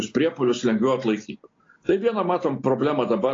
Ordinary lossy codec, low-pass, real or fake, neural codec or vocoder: AAC, 32 kbps; 7.2 kHz; real; none